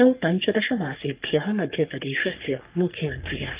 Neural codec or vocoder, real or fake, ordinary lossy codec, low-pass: codec, 44.1 kHz, 3.4 kbps, Pupu-Codec; fake; Opus, 32 kbps; 3.6 kHz